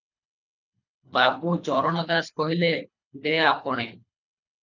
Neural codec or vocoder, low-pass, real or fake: codec, 24 kHz, 3 kbps, HILCodec; 7.2 kHz; fake